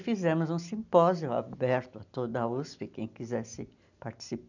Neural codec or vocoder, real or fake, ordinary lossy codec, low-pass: none; real; none; 7.2 kHz